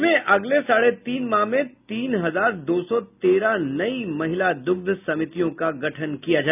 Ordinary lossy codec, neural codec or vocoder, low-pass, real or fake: none; none; 3.6 kHz; real